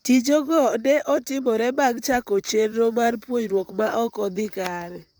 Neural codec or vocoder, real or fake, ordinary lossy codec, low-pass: vocoder, 44.1 kHz, 128 mel bands, Pupu-Vocoder; fake; none; none